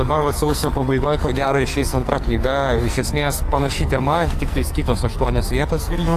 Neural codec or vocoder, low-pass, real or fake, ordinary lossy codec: codec, 44.1 kHz, 2.6 kbps, SNAC; 14.4 kHz; fake; AAC, 64 kbps